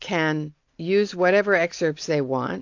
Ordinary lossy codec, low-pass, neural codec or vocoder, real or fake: AAC, 48 kbps; 7.2 kHz; none; real